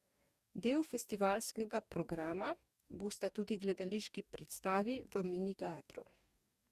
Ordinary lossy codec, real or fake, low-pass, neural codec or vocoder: Opus, 64 kbps; fake; 14.4 kHz; codec, 44.1 kHz, 2.6 kbps, DAC